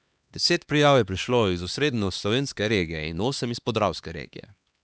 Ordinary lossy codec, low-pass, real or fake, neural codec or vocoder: none; none; fake; codec, 16 kHz, 2 kbps, X-Codec, HuBERT features, trained on LibriSpeech